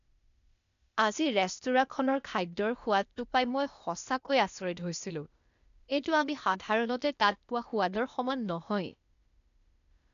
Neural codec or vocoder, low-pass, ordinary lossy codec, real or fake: codec, 16 kHz, 0.8 kbps, ZipCodec; 7.2 kHz; none; fake